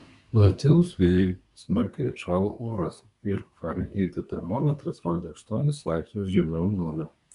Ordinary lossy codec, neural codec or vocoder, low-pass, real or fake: MP3, 96 kbps; codec, 24 kHz, 1 kbps, SNAC; 10.8 kHz; fake